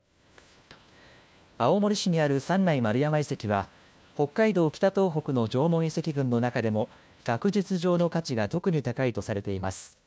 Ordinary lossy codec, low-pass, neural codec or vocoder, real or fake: none; none; codec, 16 kHz, 1 kbps, FunCodec, trained on LibriTTS, 50 frames a second; fake